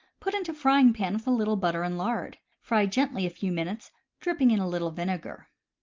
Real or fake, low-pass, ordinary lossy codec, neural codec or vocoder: real; 7.2 kHz; Opus, 32 kbps; none